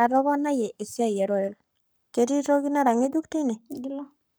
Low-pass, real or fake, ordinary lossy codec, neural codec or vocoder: none; fake; none; codec, 44.1 kHz, 7.8 kbps, Pupu-Codec